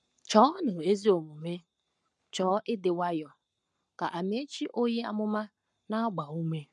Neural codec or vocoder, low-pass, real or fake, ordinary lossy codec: codec, 44.1 kHz, 7.8 kbps, Pupu-Codec; 10.8 kHz; fake; none